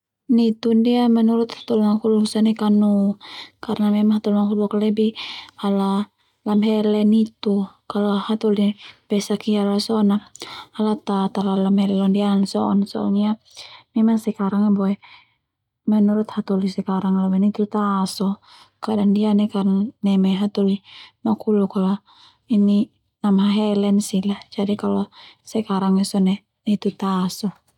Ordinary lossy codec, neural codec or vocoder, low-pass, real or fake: none; none; 19.8 kHz; real